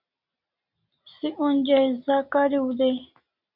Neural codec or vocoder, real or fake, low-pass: none; real; 5.4 kHz